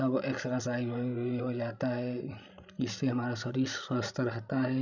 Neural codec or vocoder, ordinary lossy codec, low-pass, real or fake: codec, 16 kHz, 16 kbps, FreqCodec, larger model; none; 7.2 kHz; fake